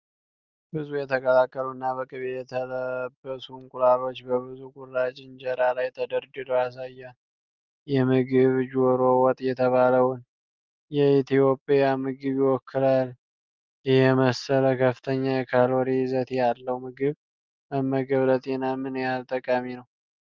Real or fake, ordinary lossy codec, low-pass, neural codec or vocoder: real; Opus, 24 kbps; 7.2 kHz; none